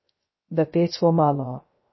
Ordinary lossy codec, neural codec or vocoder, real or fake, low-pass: MP3, 24 kbps; codec, 16 kHz, 0.3 kbps, FocalCodec; fake; 7.2 kHz